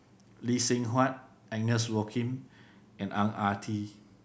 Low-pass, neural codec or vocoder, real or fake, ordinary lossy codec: none; none; real; none